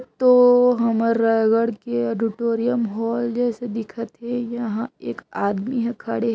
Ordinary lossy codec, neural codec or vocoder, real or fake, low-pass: none; none; real; none